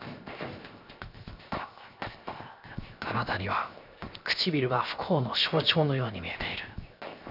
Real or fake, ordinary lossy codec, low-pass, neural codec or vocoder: fake; none; 5.4 kHz; codec, 16 kHz, 0.7 kbps, FocalCodec